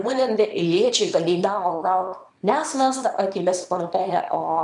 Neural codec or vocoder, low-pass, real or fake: codec, 24 kHz, 0.9 kbps, WavTokenizer, small release; 10.8 kHz; fake